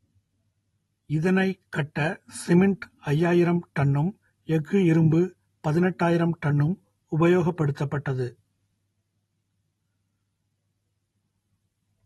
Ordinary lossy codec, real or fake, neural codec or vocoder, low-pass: AAC, 32 kbps; real; none; 19.8 kHz